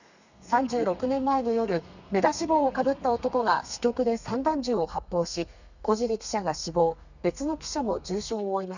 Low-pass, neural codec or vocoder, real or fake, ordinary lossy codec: 7.2 kHz; codec, 32 kHz, 1.9 kbps, SNAC; fake; none